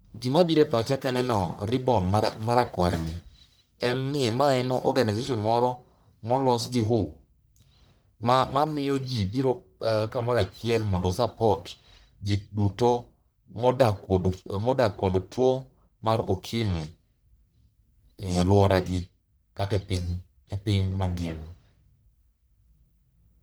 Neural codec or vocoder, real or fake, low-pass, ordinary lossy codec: codec, 44.1 kHz, 1.7 kbps, Pupu-Codec; fake; none; none